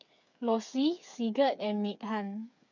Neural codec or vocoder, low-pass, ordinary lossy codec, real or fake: codec, 16 kHz, 8 kbps, FreqCodec, smaller model; 7.2 kHz; none; fake